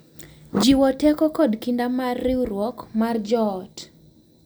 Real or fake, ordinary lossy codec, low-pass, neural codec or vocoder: real; none; none; none